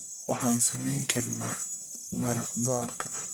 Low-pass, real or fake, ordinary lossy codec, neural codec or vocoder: none; fake; none; codec, 44.1 kHz, 1.7 kbps, Pupu-Codec